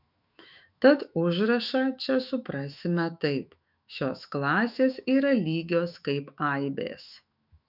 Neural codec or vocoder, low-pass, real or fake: autoencoder, 48 kHz, 128 numbers a frame, DAC-VAE, trained on Japanese speech; 5.4 kHz; fake